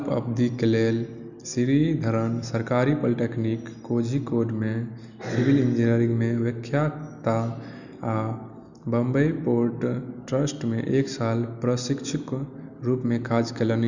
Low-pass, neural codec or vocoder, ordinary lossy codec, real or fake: 7.2 kHz; none; none; real